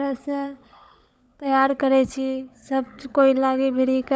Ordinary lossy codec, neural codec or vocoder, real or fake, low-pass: none; codec, 16 kHz, 16 kbps, FunCodec, trained on LibriTTS, 50 frames a second; fake; none